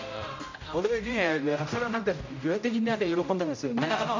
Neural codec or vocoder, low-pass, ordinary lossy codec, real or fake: codec, 16 kHz, 0.5 kbps, X-Codec, HuBERT features, trained on general audio; 7.2 kHz; MP3, 64 kbps; fake